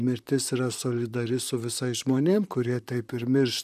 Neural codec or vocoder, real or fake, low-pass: vocoder, 44.1 kHz, 128 mel bands, Pupu-Vocoder; fake; 14.4 kHz